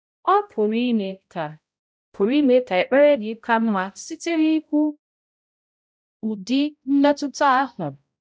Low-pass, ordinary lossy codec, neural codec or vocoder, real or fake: none; none; codec, 16 kHz, 0.5 kbps, X-Codec, HuBERT features, trained on balanced general audio; fake